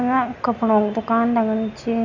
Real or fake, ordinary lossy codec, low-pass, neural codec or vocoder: real; none; 7.2 kHz; none